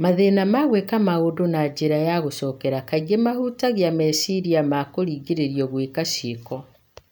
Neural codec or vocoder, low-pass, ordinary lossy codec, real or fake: none; none; none; real